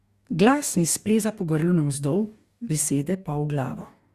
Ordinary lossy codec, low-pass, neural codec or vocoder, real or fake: Opus, 64 kbps; 14.4 kHz; codec, 44.1 kHz, 2.6 kbps, DAC; fake